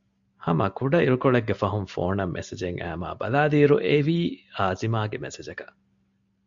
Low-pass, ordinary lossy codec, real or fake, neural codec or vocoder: 7.2 kHz; AAC, 64 kbps; real; none